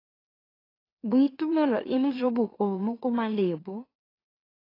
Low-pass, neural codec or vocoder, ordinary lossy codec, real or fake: 5.4 kHz; autoencoder, 44.1 kHz, a latent of 192 numbers a frame, MeloTTS; AAC, 24 kbps; fake